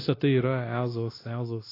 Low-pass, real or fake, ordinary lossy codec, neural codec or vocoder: 5.4 kHz; fake; AAC, 24 kbps; codec, 16 kHz, 0.9 kbps, LongCat-Audio-Codec